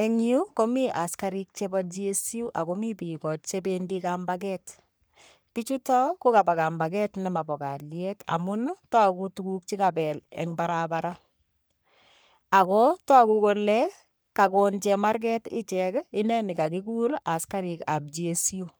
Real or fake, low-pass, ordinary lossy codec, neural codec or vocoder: fake; none; none; codec, 44.1 kHz, 3.4 kbps, Pupu-Codec